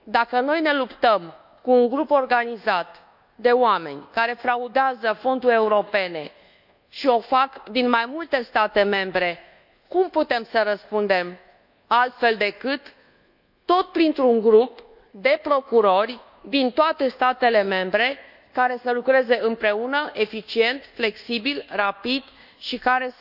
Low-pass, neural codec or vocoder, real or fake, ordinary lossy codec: 5.4 kHz; codec, 24 kHz, 1.2 kbps, DualCodec; fake; none